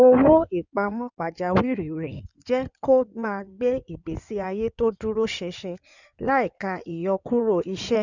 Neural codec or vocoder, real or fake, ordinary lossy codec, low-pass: codec, 16 kHz in and 24 kHz out, 2.2 kbps, FireRedTTS-2 codec; fake; none; 7.2 kHz